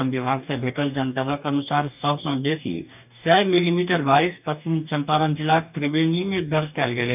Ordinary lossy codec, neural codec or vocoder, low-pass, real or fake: none; codec, 44.1 kHz, 2.6 kbps, DAC; 3.6 kHz; fake